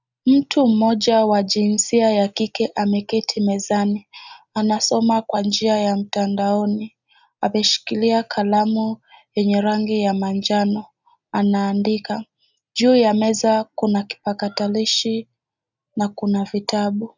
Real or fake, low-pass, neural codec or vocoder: real; 7.2 kHz; none